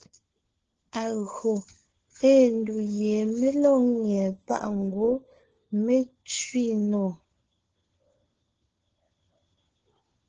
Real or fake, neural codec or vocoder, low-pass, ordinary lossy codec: fake; codec, 16 kHz, 4 kbps, FunCodec, trained on LibriTTS, 50 frames a second; 7.2 kHz; Opus, 16 kbps